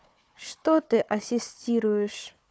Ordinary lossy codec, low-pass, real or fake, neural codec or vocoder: none; none; fake; codec, 16 kHz, 4 kbps, FunCodec, trained on Chinese and English, 50 frames a second